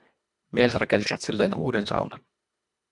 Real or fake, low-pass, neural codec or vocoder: fake; 10.8 kHz; codec, 24 kHz, 1.5 kbps, HILCodec